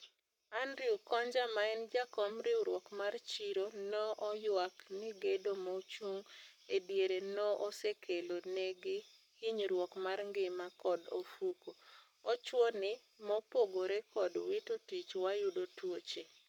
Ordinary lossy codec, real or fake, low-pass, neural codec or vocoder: none; fake; 19.8 kHz; codec, 44.1 kHz, 7.8 kbps, Pupu-Codec